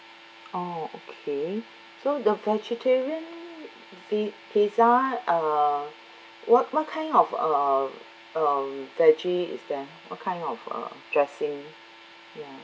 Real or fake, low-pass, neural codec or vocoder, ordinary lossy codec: real; none; none; none